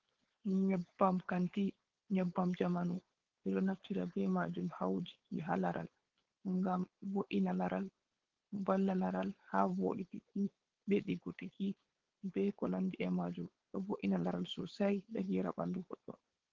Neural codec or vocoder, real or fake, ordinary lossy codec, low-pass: codec, 16 kHz, 4.8 kbps, FACodec; fake; Opus, 16 kbps; 7.2 kHz